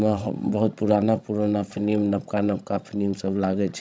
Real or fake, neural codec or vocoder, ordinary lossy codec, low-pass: fake; codec, 16 kHz, 16 kbps, FreqCodec, larger model; none; none